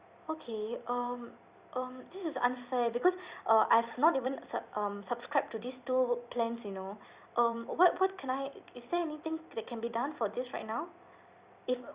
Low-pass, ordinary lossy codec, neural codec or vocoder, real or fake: 3.6 kHz; Opus, 64 kbps; vocoder, 44.1 kHz, 128 mel bands every 256 samples, BigVGAN v2; fake